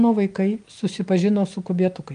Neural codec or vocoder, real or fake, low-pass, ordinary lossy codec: none; real; 9.9 kHz; Opus, 32 kbps